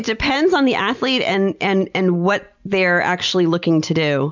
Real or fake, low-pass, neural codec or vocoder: real; 7.2 kHz; none